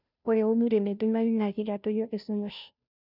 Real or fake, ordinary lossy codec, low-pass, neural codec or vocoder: fake; none; 5.4 kHz; codec, 16 kHz, 0.5 kbps, FunCodec, trained on Chinese and English, 25 frames a second